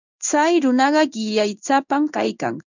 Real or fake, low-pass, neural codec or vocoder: fake; 7.2 kHz; codec, 16 kHz in and 24 kHz out, 1 kbps, XY-Tokenizer